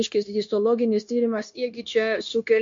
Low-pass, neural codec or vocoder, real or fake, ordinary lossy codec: 7.2 kHz; codec, 16 kHz, 0.9 kbps, LongCat-Audio-Codec; fake; MP3, 48 kbps